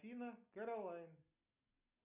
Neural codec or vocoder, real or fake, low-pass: none; real; 3.6 kHz